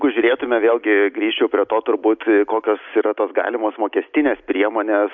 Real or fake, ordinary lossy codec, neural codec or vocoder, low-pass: real; MP3, 64 kbps; none; 7.2 kHz